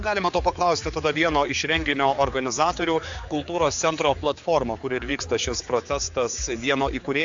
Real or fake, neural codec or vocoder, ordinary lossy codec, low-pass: fake; codec, 16 kHz, 4 kbps, X-Codec, HuBERT features, trained on general audio; AAC, 64 kbps; 7.2 kHz